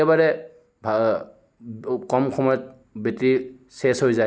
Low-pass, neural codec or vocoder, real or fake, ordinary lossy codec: none; none; real; none